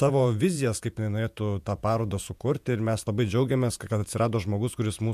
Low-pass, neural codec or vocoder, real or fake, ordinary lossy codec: 14.4 kHz; vocoder, 48 kHz, 128 mel bands, Vocos; fake; MP3, 96 kbps